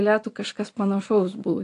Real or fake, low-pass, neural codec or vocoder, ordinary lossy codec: real; 10.8 kHz; none; AAC, 48 kbps